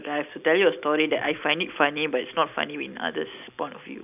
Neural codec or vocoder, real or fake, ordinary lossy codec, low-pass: none; real; none; 3.6 kHz